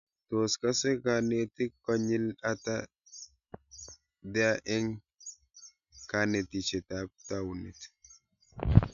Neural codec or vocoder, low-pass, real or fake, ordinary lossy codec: none; 7.2 kHz; real; none